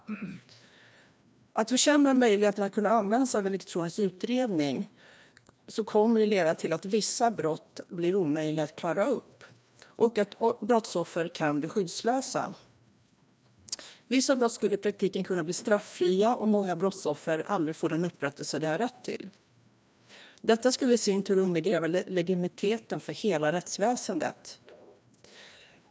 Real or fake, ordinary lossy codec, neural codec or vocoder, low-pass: fake; none; codec, 16 kHz, 1 kbps, FreqCodec, larger model; none